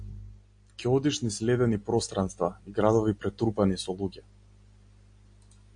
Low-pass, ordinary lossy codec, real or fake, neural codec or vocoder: 9.9 kHz; AAC, 64 kbps; real; none